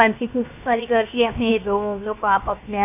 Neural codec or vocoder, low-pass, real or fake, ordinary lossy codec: codec, 16 kHz, 0.7 kbps, FocalCodec; 3.6 kHz; fake; none